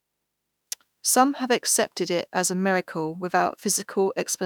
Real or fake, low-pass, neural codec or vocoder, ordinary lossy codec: fake; none; autoencoder, 48 kHz, 32 numbers a frame, DAC-VAE, trained on Japanese speech; none